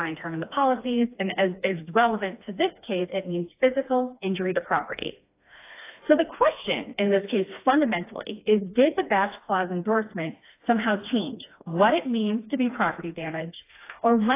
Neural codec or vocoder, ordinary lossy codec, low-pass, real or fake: codec, 16 kHz, 2 kbps, FreqCodec, smaller model; AAC, 24 kbps; 3.6 kHz; fake